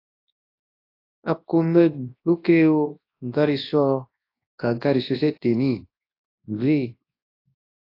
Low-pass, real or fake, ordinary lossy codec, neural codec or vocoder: 5.4 kHz; fake; AAC, 32 kbps; codec, 24 kHz, 0.9 kbps, WavTokenizer, large speech release